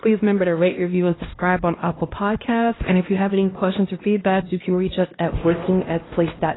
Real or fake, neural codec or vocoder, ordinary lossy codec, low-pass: fake; codec, 16 kHz, 1 kbps, X-Codec, HuBERT features, trained on LibriSpeech; AAC, 16 kbps; 7.2 kHz